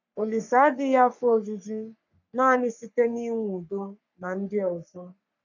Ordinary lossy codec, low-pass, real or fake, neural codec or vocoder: none; 7.2 kHz; fake; codec, 44.1 kHz, 3.4 kbps, Pupu-Codec